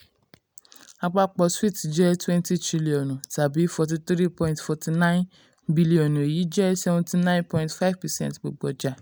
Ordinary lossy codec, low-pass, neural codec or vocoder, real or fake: none; none; none; real